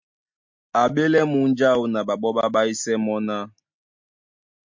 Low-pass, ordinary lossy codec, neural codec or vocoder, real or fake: 7.2 kHz; MP3, 48 kbps; none; real